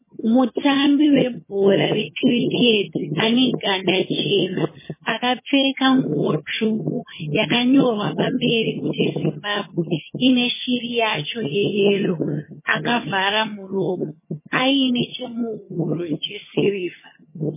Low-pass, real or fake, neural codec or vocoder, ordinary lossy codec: 3.6 kHz; fake; vocoder, 22.05 kHz, 80 mel bands, HiFi-GAN; MP3, 16 kbps